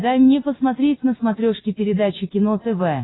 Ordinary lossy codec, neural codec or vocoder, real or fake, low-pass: AAC, 16 kbps; none; real; 7.2 kHz